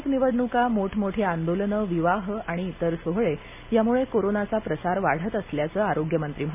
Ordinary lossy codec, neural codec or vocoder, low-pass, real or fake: MP3, 32 kbps; none; 3.6 kHz; real